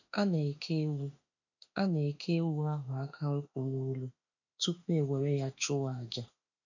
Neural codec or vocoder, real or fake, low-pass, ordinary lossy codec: autoencoder, 48 kHz, 32 numbers a frame, DAC-VAE, trained on Japanese speech; fake; 7.2 kHz; none